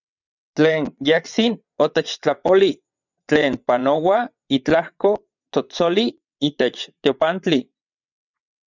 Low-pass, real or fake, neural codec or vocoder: 7.2 kHz; fake; vocoder, 22.05 kHz, 80 mel bands, WaveNeXt